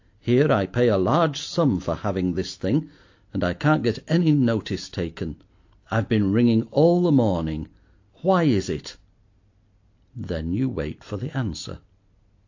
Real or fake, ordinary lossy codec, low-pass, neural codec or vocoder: real; AAC, 48 kbps; 7.2 kHz; none